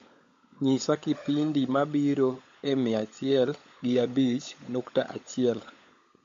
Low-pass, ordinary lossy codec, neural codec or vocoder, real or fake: 7.2 kHz; MP3, 48 kbps; codec, 16 kHz, 16 kbps, FunCodec, trained on LibriTTS, 50 frames a second; fake